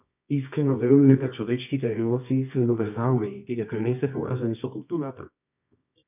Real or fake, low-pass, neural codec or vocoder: fake; 3.6 kHz; codec, 24 kHz, 0.9 kbps, WavTokenizer, medium music audio release